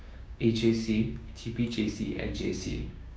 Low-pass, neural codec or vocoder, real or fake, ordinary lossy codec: none; codec, 16 kHz, 6 kbps, DAC; fake; none